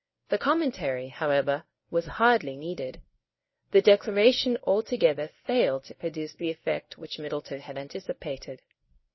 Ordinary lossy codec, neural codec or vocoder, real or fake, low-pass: MP3, 24 kbps; codec, 24 kHz, 0.9 kbps, WavTokenizer, medium speech release version 1; fake; 7.2 kHz